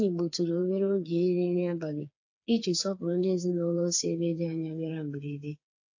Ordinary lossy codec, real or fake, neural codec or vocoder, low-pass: AAC, 48 kbps; fake; codec, 16 kHz, 4 kbps, FreqCodec, smaller model; 7.2 kHz